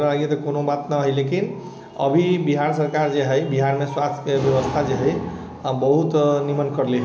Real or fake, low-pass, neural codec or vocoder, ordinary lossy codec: real; none; none; none